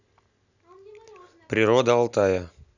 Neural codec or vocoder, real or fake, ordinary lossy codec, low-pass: none; real; none; 7.2 kHz